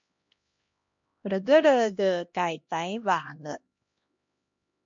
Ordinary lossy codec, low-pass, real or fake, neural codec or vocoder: MP3, 48 kbps; 7.2 kHz; fake; codec, 16 kHz, 1 kbps, X-Codec, HuBERT features, trained on LibriSpeech